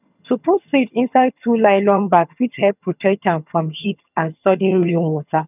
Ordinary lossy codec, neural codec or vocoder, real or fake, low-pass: none; vocoder, 22.05 kHz, 80 mel bands, HiFi-GAN; fake; 3.6 kHz